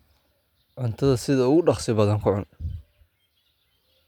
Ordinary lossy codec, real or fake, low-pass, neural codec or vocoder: none; real; 19.8 kHz; none